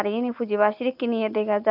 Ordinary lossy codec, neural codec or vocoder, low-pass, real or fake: none; none; 5.4 kHz; real